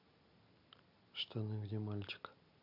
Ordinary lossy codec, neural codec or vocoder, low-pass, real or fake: none; none; 5.4 kHz; real